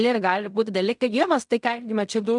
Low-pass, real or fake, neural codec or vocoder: 10.8 kHz; fake; codec, 16 kHz in and 24 kHz out, 0.4 kbps, LongCat-Audio-Codec, fine tuned four codebook decoder